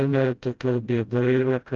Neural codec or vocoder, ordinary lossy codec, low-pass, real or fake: codec, 16 kHz, 0.5 kbps, FreqCodec, smaller model; Opus, 32 kbps; 7.2 kHz; fake